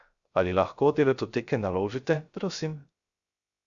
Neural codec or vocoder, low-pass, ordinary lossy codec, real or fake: codec, 16 kHz, 0.3 kbps, FocalCodec; 7.2 kHz; Opus, 64 kbps; fake